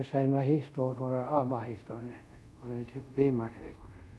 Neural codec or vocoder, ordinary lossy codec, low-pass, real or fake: codec, 24 kHz, 0.5 kbps, DualCodec; none; none; fake